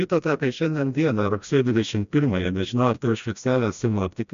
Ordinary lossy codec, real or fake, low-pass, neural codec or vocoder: MP3, 64 kbps; fake; 7.2 kHz; codec, 16 kHz, 1 kbps, FreqCodec, smaller model